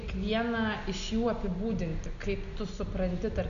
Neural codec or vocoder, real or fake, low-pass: none; real; 7.2 kHz